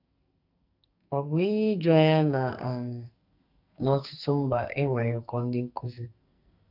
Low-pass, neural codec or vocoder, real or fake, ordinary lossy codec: 5.4 kHz; codec, 44.1 kHz, 2.6 kbps, SNAC; fake; AAC, 48 kbps